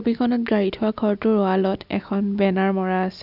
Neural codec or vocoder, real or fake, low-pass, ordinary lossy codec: none; real; 5.4 kHz; none